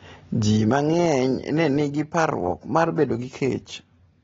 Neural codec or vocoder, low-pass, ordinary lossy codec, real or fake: none; 7.2 kHz; AAC, 24 kbps; real